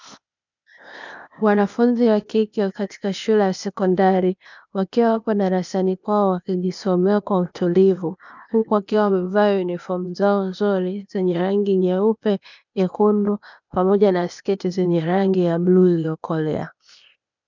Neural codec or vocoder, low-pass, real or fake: codec, 16 kHz, 0.8 kbps, ZipCodec; 7.2 kHz; fake